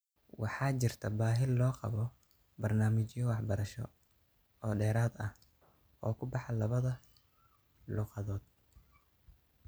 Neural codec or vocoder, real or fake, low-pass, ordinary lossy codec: none; real; none; none